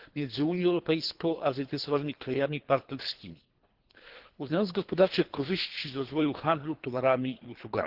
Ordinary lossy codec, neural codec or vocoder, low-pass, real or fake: Opus, 16 kbps; codec, 24 kHz, 3 kbps, HILCodec; 5.4 kHz; fake